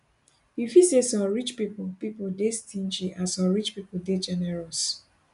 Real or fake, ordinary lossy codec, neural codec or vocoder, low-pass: real; none; none; 10.8 kHz